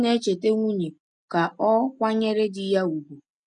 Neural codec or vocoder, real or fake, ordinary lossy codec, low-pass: none; real; none; 9.9 kHz